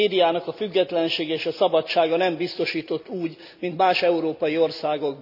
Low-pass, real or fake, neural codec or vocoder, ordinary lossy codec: 5.4 kHz; real; none; none